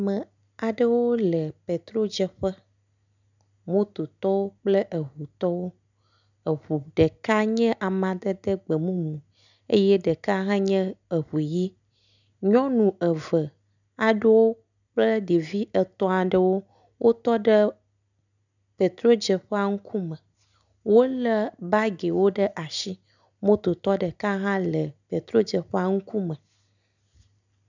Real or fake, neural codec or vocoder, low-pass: real; none; 7.2 kHz